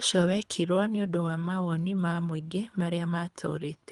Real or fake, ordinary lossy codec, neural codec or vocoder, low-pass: fake; Opus, 32 kbps; codec, 24 kHz, 3 kbps, HILCodec; 10.8 kHz